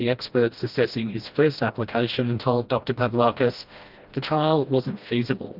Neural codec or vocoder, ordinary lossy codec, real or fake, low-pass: codec, 16 kHz, 1 kbps, FreqCodec, smaller model; Opus, 32 kbps; fake; 5.4 kHz